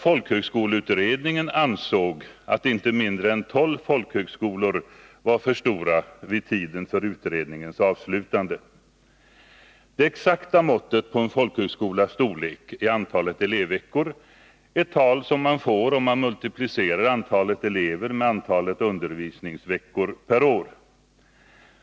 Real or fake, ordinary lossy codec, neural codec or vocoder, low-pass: real; none; none; none